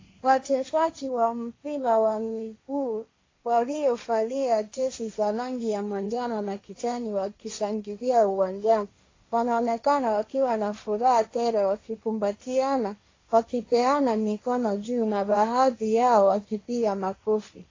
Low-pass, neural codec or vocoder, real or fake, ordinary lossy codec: 7.2 kHz; codec, 16 kHz, 1.1 kbps, Voila-Tokenizer; fake; AAC, 32 kbps